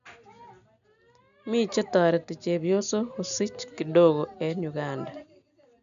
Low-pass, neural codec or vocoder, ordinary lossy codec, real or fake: 7.2 kHz; none; none; real